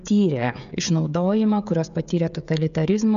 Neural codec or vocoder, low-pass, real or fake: codec, 16 kHz, 16 kbps, FreqCodec, smaller model; 7.2 kHz; fake